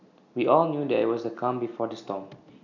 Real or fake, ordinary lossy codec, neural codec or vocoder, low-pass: real; none; none; 7.2 kHz